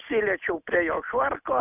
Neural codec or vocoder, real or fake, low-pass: none; real; 3.6 kHz